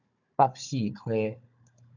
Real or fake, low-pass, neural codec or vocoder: fake; 7.2 kHz; codec, 16 kHz, 16 kbps, FunCodec, trained on Chinese and English, 50 frames a second